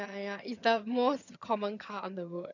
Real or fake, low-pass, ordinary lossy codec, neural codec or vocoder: fake; 7.2 kHz; none; vocoder, 22.05 kHz, 80 mel bands, HiFi-GAN